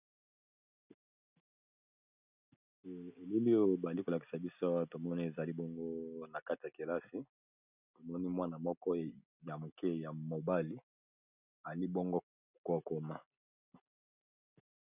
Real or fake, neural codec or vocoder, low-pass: real; none; 3.6 kHz